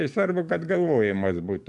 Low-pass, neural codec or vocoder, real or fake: 10.8 kHz; codec, 44.1 kHz, 7.8 kbps, DAC; fake